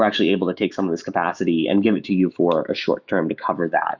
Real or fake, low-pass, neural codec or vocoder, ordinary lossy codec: fake; 7.2 kHz; autoencoder, 48 kHz, 128 numbers a frame, DAC-VAE, trained on Japanese speech; Opus, 64 kbps